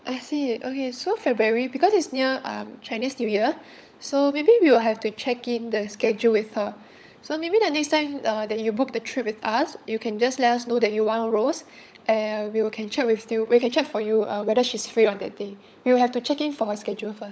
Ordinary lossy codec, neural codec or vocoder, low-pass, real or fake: none; codec, 16 kHz, 16 kbps, FunCodec, trained on LibriTTS, 50 frames a second; none; fake